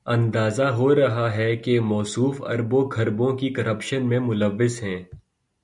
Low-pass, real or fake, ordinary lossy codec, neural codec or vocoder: 10.8 kHz; real; MP3, 96 kbps; none